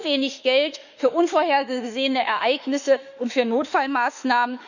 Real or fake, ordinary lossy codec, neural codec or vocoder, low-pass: fake; none; autoencoder, 48 kHz, 32 numbers a frame, DAC-VAE, trained on Japanese speech; 7.2 kHz